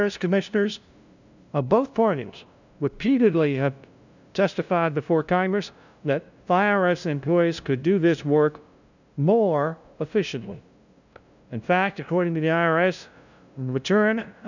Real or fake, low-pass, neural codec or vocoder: fake; 7.2 kHz; codec, 16 kHz, 0.5 kbps, FunCodec, trained on LibriTTS, 25 frames a second